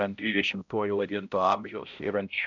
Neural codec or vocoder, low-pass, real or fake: codec, 16 kHz, 0.5 kbps, X-Codec, HuBERT features, trained on balanced general audio; 7.2 kHz; fake